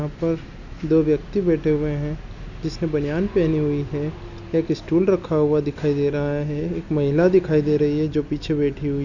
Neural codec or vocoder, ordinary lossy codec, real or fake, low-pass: none; none; real; 7.2 kHz